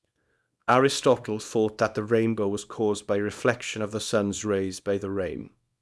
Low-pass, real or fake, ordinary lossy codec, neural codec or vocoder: none; fake; none; codec, 24 kHz, 0.9 kbps, WavTokenizer, small release